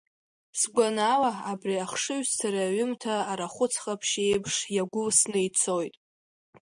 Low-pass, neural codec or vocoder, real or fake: 9.9 kHz; none; real